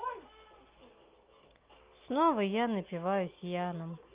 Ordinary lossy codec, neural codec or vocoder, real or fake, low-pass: Opus, 24 kbps; none; real; 3.6 kHz